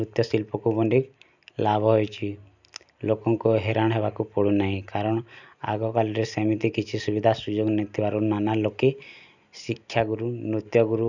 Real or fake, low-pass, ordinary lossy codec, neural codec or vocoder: real; 7.2 kHz; none; none